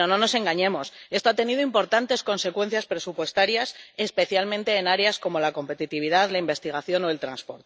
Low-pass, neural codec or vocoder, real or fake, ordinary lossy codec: none; none; real; none